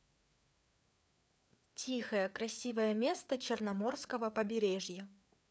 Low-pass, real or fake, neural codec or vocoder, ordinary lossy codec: none; fake; codec, 16 kHz, 4 kbps, FreqCodec, larger model; none